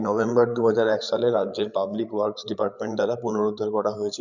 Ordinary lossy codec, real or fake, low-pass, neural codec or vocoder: none; fake; 7.2 kHz; codec, 16 kHz, 8 kbps, FreqCodec, larger model